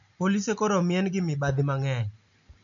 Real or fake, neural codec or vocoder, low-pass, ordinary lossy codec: real; none; 7.2 kHz; none